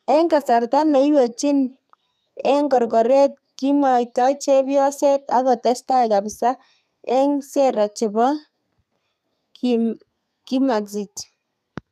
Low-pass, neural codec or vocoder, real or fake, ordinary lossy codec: 14.4 kHz; codec, 32 kHz, 1.9 kbps, SNAC; fake; none